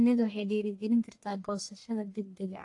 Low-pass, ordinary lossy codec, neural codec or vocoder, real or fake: 10.8 kHz; AAC, 48 kbps; codec, 44.1 kHz, 1.7 kbps, Pupu-Codec; fake